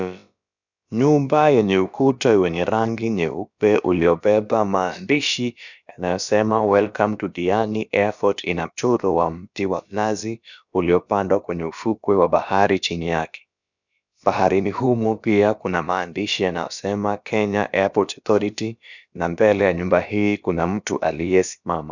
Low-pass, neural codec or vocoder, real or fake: 7.2 kHz; codec, 16 kHz, about 1 kbps, DyCAST, with the encoder's durations; fake